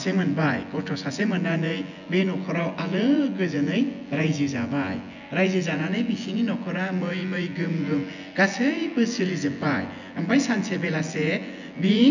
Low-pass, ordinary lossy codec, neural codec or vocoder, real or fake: 7.2 kHz; none; vocoder, 24 kHz, 100 mel bands, Vocos; fake